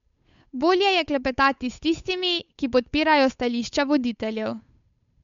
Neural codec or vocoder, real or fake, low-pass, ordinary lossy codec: codec, 16 kHz, 8 kbps, FunCodec, trained on Chinese and English, 25 frames a second; fake; 7.2 kHz; MP3, 64 kbps